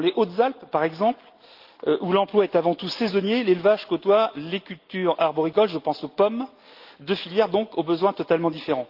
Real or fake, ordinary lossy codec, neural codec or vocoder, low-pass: real; Opus, 32 kbps; none; 5.4 kHz